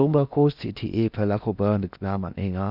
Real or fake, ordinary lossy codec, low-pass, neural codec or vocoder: fake; none; 5.4 kHz; codec, 16 kHz in and 24 kHz out, 0.6 kbps, FocalCodec, streaming, 4096 codes